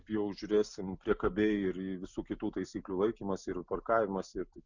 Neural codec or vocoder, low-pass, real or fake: none; 7.2 kHz; real